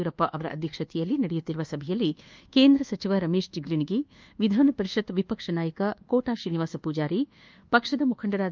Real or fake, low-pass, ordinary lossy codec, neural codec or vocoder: fake; 7.2 kHz; Opus, 24 kbps; codec, 24 kHz, 1.2 kbps, DualCodec